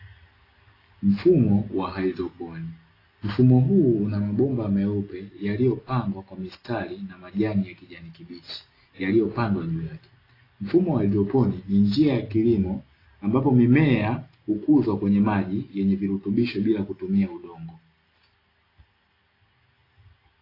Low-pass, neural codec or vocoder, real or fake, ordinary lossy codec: 5.4 kHz; none; real; AAC, 24 kbps